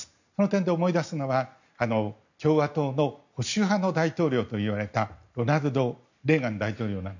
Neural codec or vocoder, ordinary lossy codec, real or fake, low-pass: none; none; real; 7.2 kHz